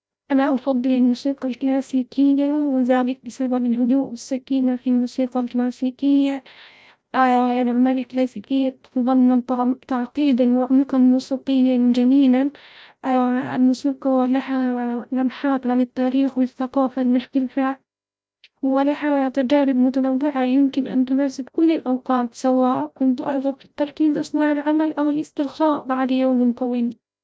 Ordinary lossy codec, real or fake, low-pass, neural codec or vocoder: none; fake; none; codec, 16 kHz, 0.5 kbps, FreqCodec, larger model